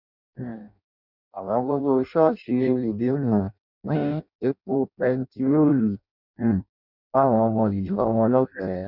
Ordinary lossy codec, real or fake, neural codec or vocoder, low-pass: none; fake; codec, 16 kHz in and 24 kHz out, 0.6 kbps, FireRedTTS-2 codec; 5.4 kHz